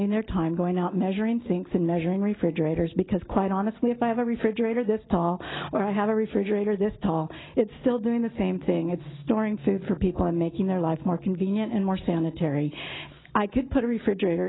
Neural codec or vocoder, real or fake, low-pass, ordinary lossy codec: none; real; 7.2 kHz; AAC, 16 kbps